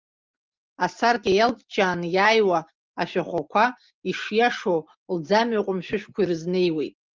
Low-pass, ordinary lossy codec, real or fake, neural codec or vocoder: 7.2 kHz; Opus, 32 kbps; real; none